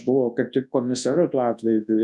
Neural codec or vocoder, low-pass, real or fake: codec, 24 kHz, 0.9 kbps, WavTokenizer, large speech release; 10.8 kHz; fake